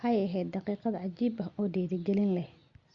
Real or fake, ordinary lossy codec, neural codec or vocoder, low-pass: real; Opus, 64 kbps; none; 7.2 kHz